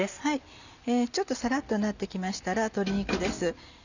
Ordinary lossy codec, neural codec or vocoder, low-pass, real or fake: none; none; 7.2 kHz; real